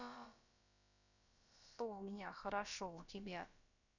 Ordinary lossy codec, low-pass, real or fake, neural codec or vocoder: none; 7.2 kHz; fake; codec, 16 kHz, about 1 kbps, DyCAST, with the encoder's durations